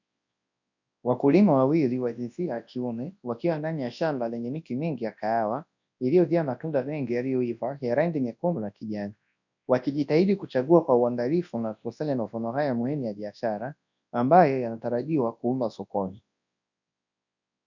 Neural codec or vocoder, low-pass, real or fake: codec, 24 kHz, 0.9 kbps, WavTokenizer, large speech release; 7.2 kHz; fake